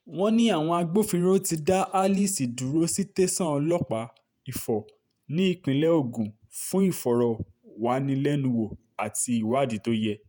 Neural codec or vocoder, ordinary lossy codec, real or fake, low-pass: vocoder, 48 kHz, 128 mel bands, Vocos; none; fake; none